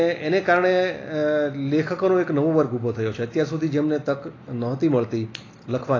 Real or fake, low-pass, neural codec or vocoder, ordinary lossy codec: real; 7.2 kHz; none; AAC, 32 kbps